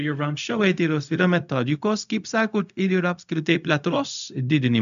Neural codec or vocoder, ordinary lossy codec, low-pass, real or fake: codec, 16 kHz, 0.4 kbps, LongCat-Audio-Codec; AAC, 96 kbps; 7.2 kHz; fake